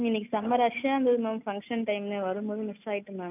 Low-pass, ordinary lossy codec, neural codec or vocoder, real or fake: 3.6 kHz; none; none; real